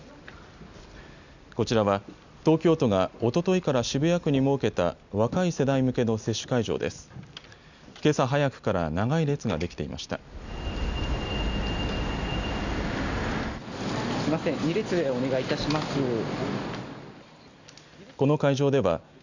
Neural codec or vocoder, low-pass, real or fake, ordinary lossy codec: none; 7.2 kHz; real; none